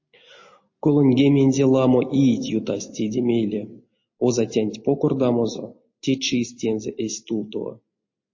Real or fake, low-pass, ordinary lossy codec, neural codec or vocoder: real; 7.2 kHz; MP3, 32 kbps; none